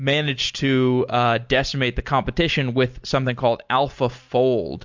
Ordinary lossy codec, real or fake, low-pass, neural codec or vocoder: MP3, 64 kbps; real; 7.2 kHz; none